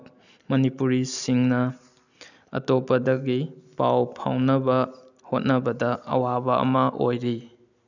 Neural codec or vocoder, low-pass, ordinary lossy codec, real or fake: none; 7.2 kHz; none; real